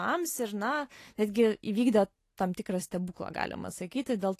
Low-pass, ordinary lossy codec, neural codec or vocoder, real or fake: 14.4 kHz; AAC, 48 kbps; none; real